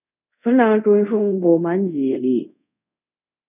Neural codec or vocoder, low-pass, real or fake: codec, 24 kHz, 0.5 kbps, DualCodec; 3.6 kHz; fake